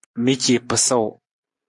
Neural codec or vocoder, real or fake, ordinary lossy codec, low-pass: none; real; AAC, 64 kbps; 10.8 kHz